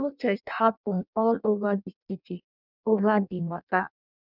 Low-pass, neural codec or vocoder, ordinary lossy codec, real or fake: 5.4 kHz; codec, 16 kHz in and 24 kHz out, 0.6 kbps, FireRedTTS-2 codec; none; fake